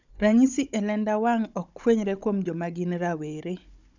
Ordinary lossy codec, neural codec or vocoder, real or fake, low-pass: none; codec, 16 kHz, 16 kbps, FunCodec, trained on Chinese and English, 50 frames a second; fake; 7.2 kHz